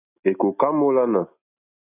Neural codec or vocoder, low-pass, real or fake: none; 3.6 kHz; real